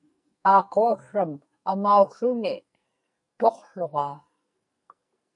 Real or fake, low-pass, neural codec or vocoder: fake; 10.8 kHz; codec, 44.1 kHz, 2.6 kbps, SNAC